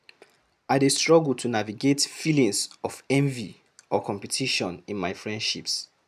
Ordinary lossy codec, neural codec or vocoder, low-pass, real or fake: none; none; 14.4 kHz; real